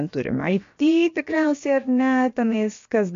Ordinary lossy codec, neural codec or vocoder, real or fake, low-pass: MP3, 48 kbps; codec, 16 kHz, about 1 kbps, DyCAST, with the encoder's durations; fake; 7.2 kHz